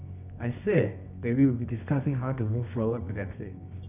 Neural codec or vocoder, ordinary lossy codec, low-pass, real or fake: codec, 24 kHz, 0.9 kbps, WavTokenizer, medium music audio release; none; 3.6 kHz; fake